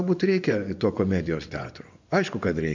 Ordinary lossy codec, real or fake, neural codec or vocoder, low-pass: MP3, 64 kbps; fake; vocoder, 24 kHz, 100 mel bands, Vocos; 7.2 kHz